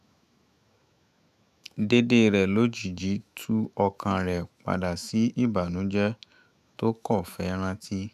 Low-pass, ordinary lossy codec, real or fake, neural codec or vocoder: 14.4 kHz; none; fake; autoencoder, 48 kHz, 128 numbers a frame, DAC-VAE, trained on Japanese speech